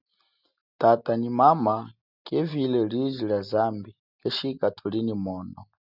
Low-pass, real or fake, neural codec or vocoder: 5.4 kHz; real; none